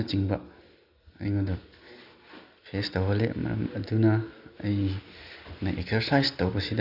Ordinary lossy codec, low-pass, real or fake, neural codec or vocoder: none; 5.4 kHz; real; none